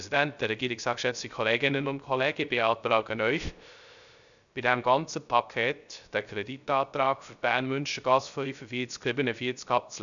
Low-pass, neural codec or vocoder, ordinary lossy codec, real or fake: 7.2 kHz; codec, 16 kHz, 0.3 kbps, FocalCodec; none; fake